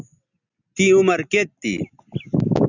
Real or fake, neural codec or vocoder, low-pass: real; none; 7.2 kHz